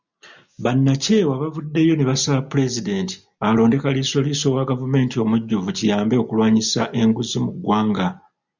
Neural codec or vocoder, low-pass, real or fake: none; 7.2 kHz; real